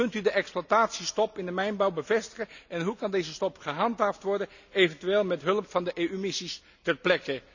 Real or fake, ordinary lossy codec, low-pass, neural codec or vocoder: real; none; 7.2 kHz; none